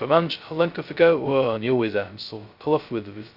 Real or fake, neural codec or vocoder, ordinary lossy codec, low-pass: fake; codec, 16 kHz, 0.2 kbps, FocalCodec; Opus, 64 kbps; 5.4 kHz